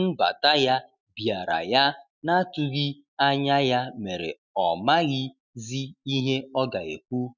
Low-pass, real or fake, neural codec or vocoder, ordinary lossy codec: 7.2 kHz; real; none; none